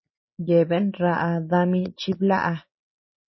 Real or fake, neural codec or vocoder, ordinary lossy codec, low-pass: real; none; MP3, 24 kbps; 7.2 kHz